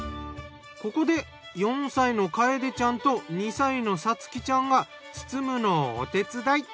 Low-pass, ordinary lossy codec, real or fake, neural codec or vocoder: none; none; real; none